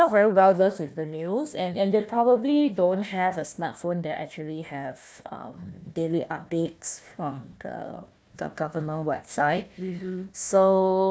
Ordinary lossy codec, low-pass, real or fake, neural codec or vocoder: none; none; fake; codec, 16 kHz, 1 kbps, FunCodec, trained on Chinese and English, 50 frames a second